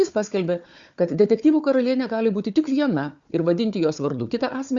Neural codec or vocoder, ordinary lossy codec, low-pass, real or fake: codec, 16 kHz, 4 kbps, FunCodec, trained on Chinese and English, 50 frames a second; Opus, 64 kbps; 7.2 kHz; fake